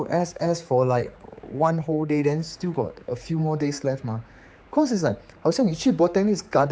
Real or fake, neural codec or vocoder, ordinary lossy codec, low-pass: fake; codec, 16 kHz, 4 kbps, X-Codec, HuBERT features, trained on general audio; none; none